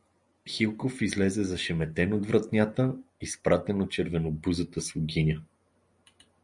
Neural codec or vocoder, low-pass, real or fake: none; 10.8 kHz; real